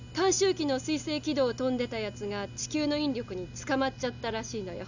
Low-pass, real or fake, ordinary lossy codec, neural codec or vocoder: 7.2 kHz; real; none; none